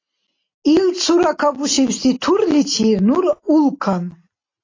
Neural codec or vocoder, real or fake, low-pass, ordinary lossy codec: none; real; 7.2 kHz; AAC, 32 kbps